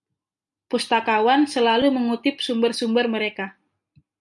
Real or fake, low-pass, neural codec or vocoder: real; 10.8 kHz; none